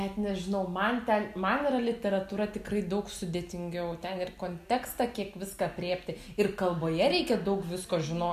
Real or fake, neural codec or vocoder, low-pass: real; none; 14.4 kHz